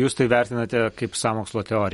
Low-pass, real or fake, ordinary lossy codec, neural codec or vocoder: 19.8 kHz; real; MP3, 48 kbps; none